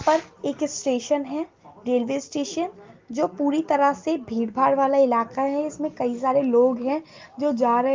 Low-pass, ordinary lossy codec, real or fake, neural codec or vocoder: 7.2 kHz; Opus, 24 kbps; real; none